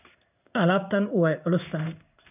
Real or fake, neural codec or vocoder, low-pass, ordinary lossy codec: fake; codec, 16 kHz in and 24 kHz out, 1 kbps, XY-Tokenizer; 3.6 kHz; none